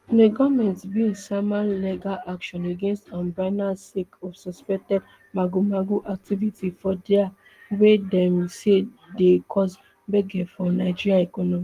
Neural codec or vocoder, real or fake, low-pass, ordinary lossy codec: none; real; 14.4 kHz; Opus, 24 kbps